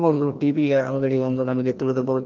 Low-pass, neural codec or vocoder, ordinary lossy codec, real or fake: 7.2 kHz; codec, 16 kHz, 1 kbps, FreqCodec, larger model; Opus, 32 kbps; fake